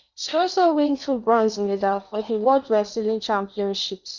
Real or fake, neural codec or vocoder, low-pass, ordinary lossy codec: fake; codec, 16 kHz in and 24 kHz out, 0.8 kbps, FocalCodec, streaming, 65536 codes; 7.2 kHz; none